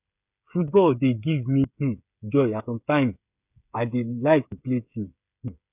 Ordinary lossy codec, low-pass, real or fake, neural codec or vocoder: none; 3.6 kHz; fake; codec, 16 kHz, 8 kbps, FreqCodec, smaller model